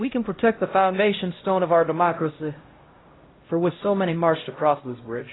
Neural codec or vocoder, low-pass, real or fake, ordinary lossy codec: codec, 16 kHz, 0.5 kbps, X-Codec, HuBERT features, trained on LibriSpeech; 7.2 kHz; fake; AAC, 16 kbps